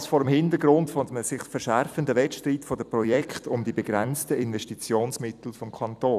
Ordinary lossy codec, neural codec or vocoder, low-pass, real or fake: none; vocoder, 44.1 kHz, 128 mel bands every 256 samples, BigVGAN v2; 14.4 kHz; fake